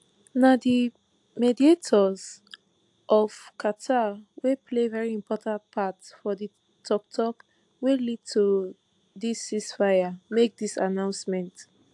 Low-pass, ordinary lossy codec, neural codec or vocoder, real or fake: 10.8 kHz; AAC, 64 kbps; none; real